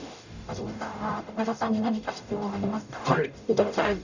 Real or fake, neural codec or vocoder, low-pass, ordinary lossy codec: fake; codec, 44.1 kHz, 0.9 kbps, DAC; 7.2 kHz; none